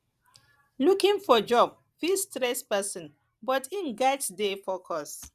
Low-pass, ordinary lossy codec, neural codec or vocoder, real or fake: 14.4 kHz; Opus, 64 kbps; vocoder, 44.1 kHz, 128 mel bands every 512 samples, BigVGAN v2; fake